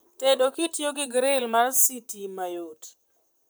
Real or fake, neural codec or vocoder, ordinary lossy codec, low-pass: fake; vocoder, 44.1 kHz, 128 mel bands, Pupu-Vocoder; none; none